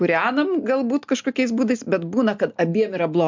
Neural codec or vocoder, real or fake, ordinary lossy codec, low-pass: none; real; MP3, 64 kbps; 7.2 kHz